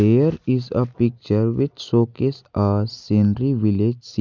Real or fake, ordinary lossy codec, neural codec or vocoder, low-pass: real; none; none; 7.2 kHz